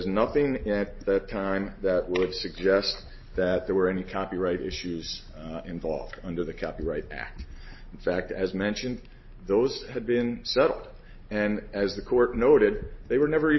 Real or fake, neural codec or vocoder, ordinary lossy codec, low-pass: real; none; MP3, 24 kbps; 7.2 kHz